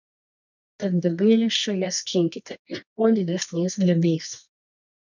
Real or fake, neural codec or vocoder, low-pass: fake; codec, 24 kHz, 0.9 kbps, WavTokenizer, medium music audio release; 7.2 kHz